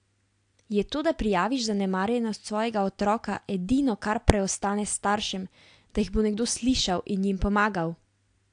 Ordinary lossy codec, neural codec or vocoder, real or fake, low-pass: AAC, 64 kbps; none; real; 9.9 kHz